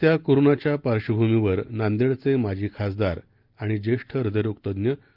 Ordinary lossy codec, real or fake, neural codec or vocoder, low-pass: Opus, 32 kbps; real; none; 5.4 kHz